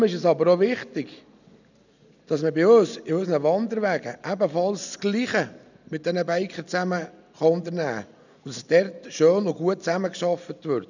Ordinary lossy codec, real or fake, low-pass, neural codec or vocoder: none; real; 7.2 kHz; none